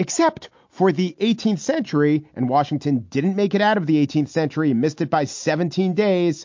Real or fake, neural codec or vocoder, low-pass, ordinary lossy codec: real; none; 7.2 kHz; MP3, 48 kbps